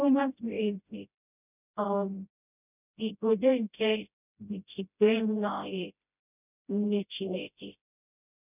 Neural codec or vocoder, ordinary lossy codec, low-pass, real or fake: codec, 16 kHz, 0.5 kbps, FreqCodec, smaller model; none; 3.6 kHz; fake